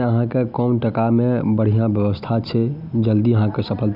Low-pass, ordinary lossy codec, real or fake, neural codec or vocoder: 5.4 kHz; none; real; none